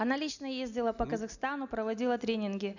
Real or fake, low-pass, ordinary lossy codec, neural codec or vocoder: real; 7.2 kHz; none; none